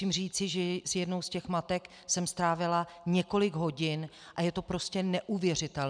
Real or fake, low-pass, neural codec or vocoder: real; 9.9 kHz; none